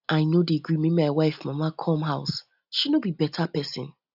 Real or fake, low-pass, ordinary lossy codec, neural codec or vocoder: real; 5.4 kHz; none; none